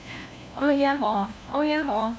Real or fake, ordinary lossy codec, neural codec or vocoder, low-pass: fake; none; codec, 16 kHz, 1 kbps, FunCodec, trained on LibriTTS, 50 frames a second; none